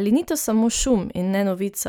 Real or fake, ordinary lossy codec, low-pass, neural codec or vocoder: real; none; none; none